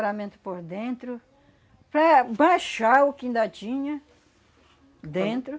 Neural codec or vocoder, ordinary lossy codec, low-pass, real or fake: none; none; none; real